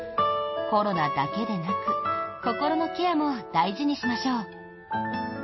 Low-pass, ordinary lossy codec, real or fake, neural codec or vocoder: 7.2 kHz; MP3, 24 kbps; real; none